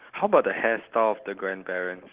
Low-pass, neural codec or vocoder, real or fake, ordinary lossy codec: 3.6 kHz; none; real; Opus, 16 kbps